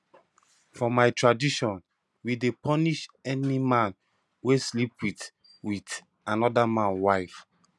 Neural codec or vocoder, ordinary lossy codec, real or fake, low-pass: none; none; real; none